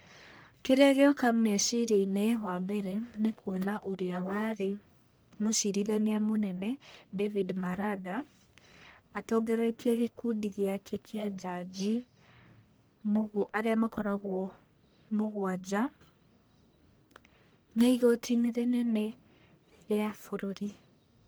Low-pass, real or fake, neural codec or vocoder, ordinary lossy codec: none; fake; codec, 44.1 kHz, 1.7 kbps, Pupu-Codec; none